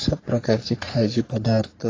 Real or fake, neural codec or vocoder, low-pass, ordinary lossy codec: fake; codec, 44.1 kHz, 3.4 kbps, Pupu-Codec; 7.2 kHz; AAC, 32 kbps